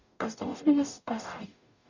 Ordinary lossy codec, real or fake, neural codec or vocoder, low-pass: none; fake; codec, 44.1 kHz, 0.9 kbps, DAC; 7.2 kHz